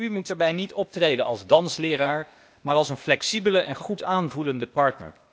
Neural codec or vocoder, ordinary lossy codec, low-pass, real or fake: codec, 16 kHz, 0.8 kbps, ZipCodec; none; none; fake